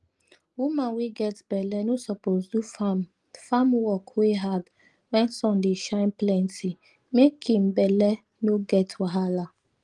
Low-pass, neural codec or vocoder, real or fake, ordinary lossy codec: 10.8 kHz; none; real; Opus, 24 kbps